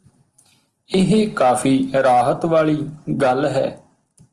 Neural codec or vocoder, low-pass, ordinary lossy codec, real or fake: none; 10.8 kHz; Opus, 24 kbps; real